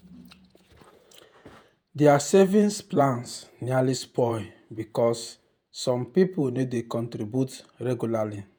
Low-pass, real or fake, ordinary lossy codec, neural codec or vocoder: none; fake; none; vocoder, 48 kHz, 128 mel bands, Vocos